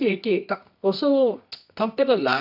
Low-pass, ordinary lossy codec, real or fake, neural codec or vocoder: 5.4 kHz; none; fake; codec, 24 kHz, 0.9 kbps, WavTokenizer, medium music audio release